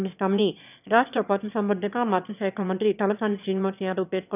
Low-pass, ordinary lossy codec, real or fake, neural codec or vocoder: 3.6 kHz; none; fake; autoencoder, 22.05 kHz, a latent of 192 numbers a frame, VITS, trained on one speaker